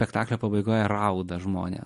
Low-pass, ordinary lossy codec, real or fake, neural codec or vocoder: 14.4 kHz; MP3, 48 kbps; real; none